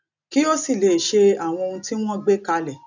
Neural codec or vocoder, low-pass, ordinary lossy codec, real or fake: none; 7.2 kHz; none; real